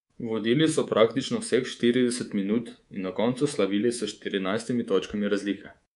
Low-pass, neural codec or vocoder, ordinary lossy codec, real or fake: 10.8 kHz; codec, 24 kHz, 3.1 kbps, DualCodec; none; fake